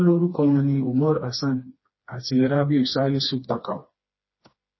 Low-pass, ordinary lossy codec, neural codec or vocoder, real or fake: 7.2 kHz; MP3, 24 kbps; codec, 16 kHz, 2 kbps, FreqCodec, smaller model; fake